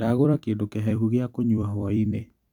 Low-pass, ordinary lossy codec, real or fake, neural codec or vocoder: 19.8 kHz; none; fake; vocoder, 44.1 kHz, 128 mel bands every 256 samples, BigVGAN v2